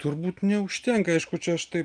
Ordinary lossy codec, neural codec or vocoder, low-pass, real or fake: Opus, 32 kbps; none; 9.9 kHz; real